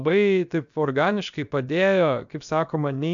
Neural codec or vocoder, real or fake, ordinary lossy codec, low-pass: codec, 16 kHz, about 1 kbps, DyCAST, with the encoder's durations; fake; MP3, 96 kbps; 7.2 kHz